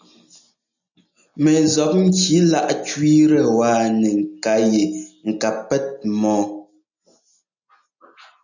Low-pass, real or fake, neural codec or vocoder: 7.2 kHz; real; none